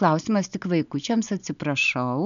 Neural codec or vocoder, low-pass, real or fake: none; 7.2 kHz; real